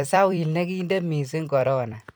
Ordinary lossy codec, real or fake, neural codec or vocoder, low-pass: none; fake; vocoder, 44.1 kHz, 128 mel bands every 256 samples, BigVGAN v2; none